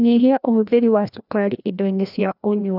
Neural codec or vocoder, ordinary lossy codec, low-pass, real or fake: codec, 16 kHz, 1 kbps, FreqCodec, larger model; none; 5.4 kHz; fake